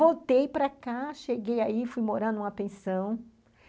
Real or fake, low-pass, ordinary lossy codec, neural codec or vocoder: real; none; none; none